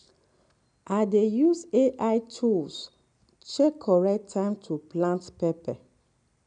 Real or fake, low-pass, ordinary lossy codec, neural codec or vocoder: real; 9.9 kHz; none; none